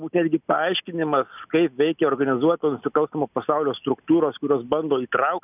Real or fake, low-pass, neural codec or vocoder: real; 3.6 kHz; none